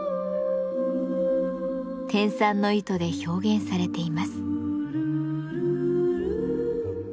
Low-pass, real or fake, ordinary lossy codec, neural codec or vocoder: none; real; none; none